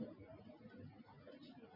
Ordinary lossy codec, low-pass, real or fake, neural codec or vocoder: MP3, 48 kbps; 5.4 kHz; real; none